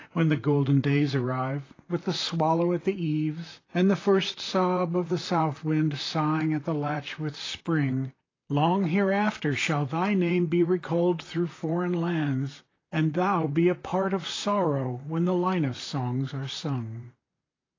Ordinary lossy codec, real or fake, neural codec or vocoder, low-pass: AAC, 32 kbps; fake; vocoder, 44.1 kHz, 128 mel bands, Pupu-Vocoder; 7.2 kHz